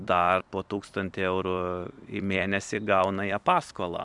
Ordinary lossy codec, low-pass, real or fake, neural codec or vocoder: MP3, 96 kbps; 10.8 kHz; fake; vocoder, 44.1 kHz, 128 mel bands every 256 samples, BigVGAN v2